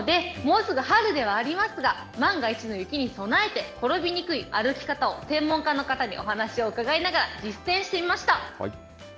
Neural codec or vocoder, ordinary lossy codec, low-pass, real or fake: none; none; none; real